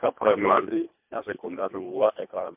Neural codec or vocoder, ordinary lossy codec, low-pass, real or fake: codec, 24 kHz, 1.5 kbps, HILCodec; MP3, 32 kbps; 3.6 kHz; fake